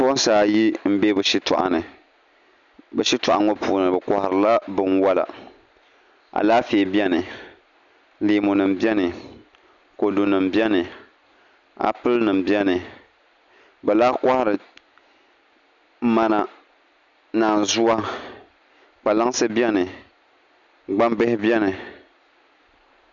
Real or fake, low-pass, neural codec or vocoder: real; 7.2 kHz; none